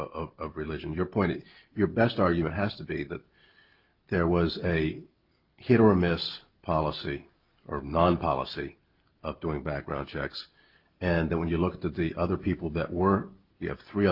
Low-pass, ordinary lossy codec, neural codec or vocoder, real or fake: 5.4 kHz; Opus, 32 kbps; none; real